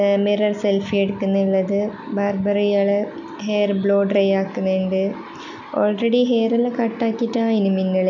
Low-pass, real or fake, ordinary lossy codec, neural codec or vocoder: 7.2 kHz; real; none; none